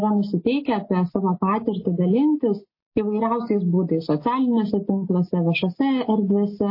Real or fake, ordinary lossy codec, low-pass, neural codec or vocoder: real; MP3, 24 kbps; 5.4 kHz; none